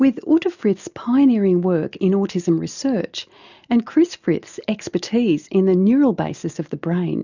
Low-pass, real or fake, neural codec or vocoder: 7.2 kHz; real; none